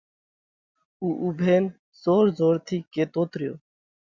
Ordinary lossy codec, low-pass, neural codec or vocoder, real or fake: Opus, 64 kbps; 7.2 kHz; none; real